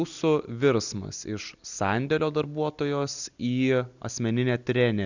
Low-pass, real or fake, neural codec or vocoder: 7.2 kHz; real; none